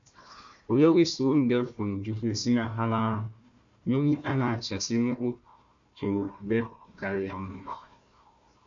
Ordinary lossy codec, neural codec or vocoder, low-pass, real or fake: MP3, 64 kbps; codec, 16 kHz, 1 kbps, FunCodec, trained on Chinese and English, 50 frames a second; 7.2 kHz; fake